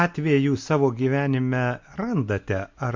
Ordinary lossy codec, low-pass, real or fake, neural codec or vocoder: MP3, 48 kbps; 7.2 kHz; real; none